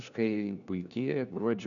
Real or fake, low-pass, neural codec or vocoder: fake; 7.2 kHz; codec, 16 kHz, 1 kbps, FunCodec, trained on LibriTTS, 50 frames a second